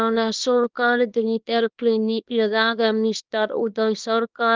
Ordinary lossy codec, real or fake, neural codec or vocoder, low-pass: Opus, 32 kbps; fake; codec, 24 kHz, 0.9 kbps, WavTokenizer, small release; 7.2 kHz